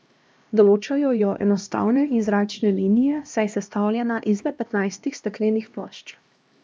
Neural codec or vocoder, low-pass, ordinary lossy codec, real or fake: codec, 16 kHz, 1 kbps, X-Codec, HuBERT features, trained on LibriSpeech; none; none; fake